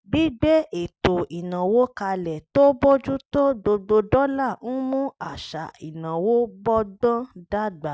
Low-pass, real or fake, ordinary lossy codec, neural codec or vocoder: none; real; none; none